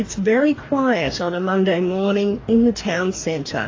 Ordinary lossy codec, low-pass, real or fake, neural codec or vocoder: AAC, 32 kbps; 7.2 kHz; fake; codec, 44.1 kHz, 2.6 kbps, DAC